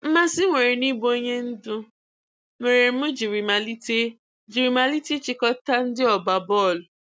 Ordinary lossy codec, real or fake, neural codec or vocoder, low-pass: none; real; none; none